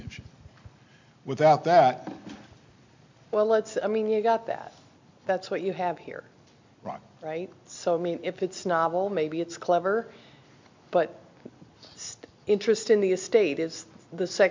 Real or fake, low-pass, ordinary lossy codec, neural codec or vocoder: real; 7.2 kHz; MP3, 64 kbps; none